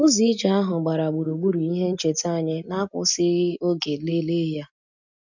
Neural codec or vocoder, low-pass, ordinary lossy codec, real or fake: none; 7.2 kHz; none; real